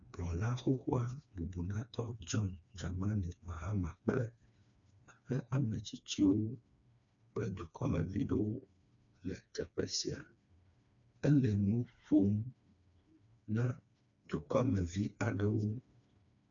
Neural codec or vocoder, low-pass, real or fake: codec, 16 kHz, 2 kbps, FreqCodec, smaller model; 7.2 kHz; fake